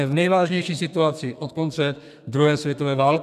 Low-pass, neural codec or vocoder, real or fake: 14.4 kHz; codec, 32 kHz, 1.9 kbps, SNAC; fake